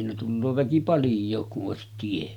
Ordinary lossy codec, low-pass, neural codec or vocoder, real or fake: none; 19.8 kHz; none; real